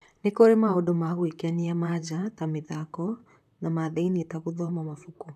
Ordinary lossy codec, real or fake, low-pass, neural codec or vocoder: none; fake; 14.4 kHz; vocoder, 44.1 kHz, 128 mel bands, Pupu-Vocoder